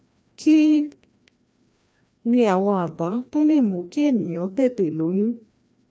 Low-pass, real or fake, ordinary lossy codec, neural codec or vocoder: none; fake; none; codec, 16 kHz, 1 kbps, FreqCodec, larger model